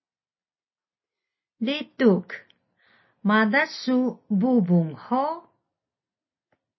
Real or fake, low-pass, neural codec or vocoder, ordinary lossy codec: real; 7.2 kHz; none; MP3, 24 kbps